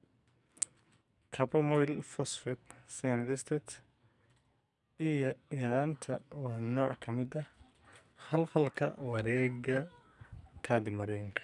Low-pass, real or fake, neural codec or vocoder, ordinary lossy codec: 10.8 kHz; fake; codec, 44.1 kHz, 2.6 kbps, SNAC; none